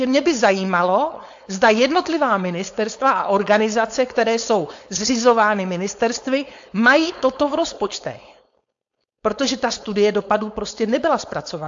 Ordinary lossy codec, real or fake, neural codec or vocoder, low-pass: AAC, 64 kbps; fake; codec, 16 kHz, 4.8 kbps, FACodec; 7.2 kHz